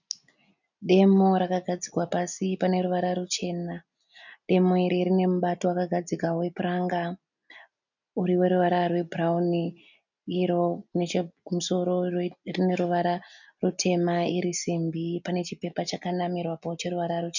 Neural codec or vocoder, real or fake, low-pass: none; real; 7.2 kHz